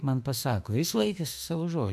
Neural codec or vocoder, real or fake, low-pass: autoencoder, 48 kHz, 32 numbers a frame, DAC-VAE, trained on Japanese speech; fake; 14.4 kHz